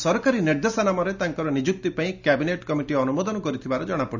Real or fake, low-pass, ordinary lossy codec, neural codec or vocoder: real; 7.2 kHz; none; none